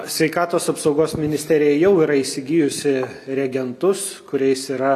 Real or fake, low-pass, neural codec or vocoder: real; 14.4 kHz; none